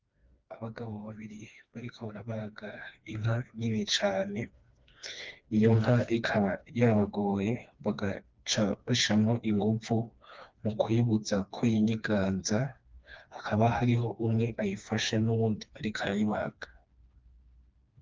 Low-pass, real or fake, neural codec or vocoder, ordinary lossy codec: 7.2 kHz; fake; codec, 16 kHz, 2 kbps, FreqCodec, smaller model; Opus, 32 kbps